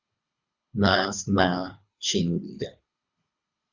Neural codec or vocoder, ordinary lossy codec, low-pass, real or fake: codec, 24 kHz, 3 kbps, HILCodec; Opus, 64 kbps; 7.2 kHz; fake